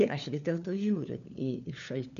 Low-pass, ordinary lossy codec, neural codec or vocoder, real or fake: 7.2 kHz; MP3, 96 kbps; codec, 16 kHz, 4 kbps, FunCodec, trained on LibriTTS, 50 frames a second; fake